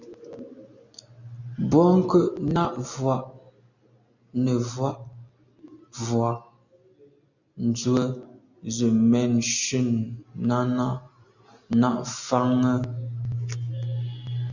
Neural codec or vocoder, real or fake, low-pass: none; real; 7.2 kHz